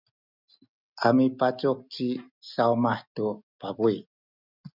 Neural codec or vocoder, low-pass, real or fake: none; 5.4 kHz; real